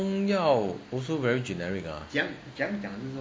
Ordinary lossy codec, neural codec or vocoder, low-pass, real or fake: none; none; none; real